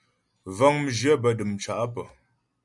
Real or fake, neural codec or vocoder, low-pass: real; none; 10.8 kHz